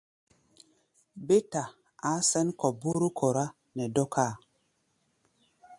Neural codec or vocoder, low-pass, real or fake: none; 10.8 kHz; real